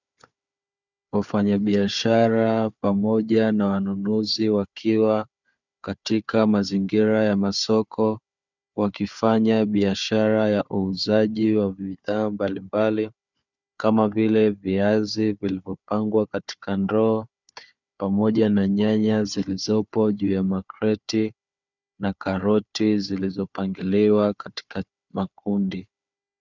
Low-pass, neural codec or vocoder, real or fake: 7.2 kHz; codec, 16 kHz, 4 kbps, FunCodec, trained on Chinese and English, 50 frames a second; fake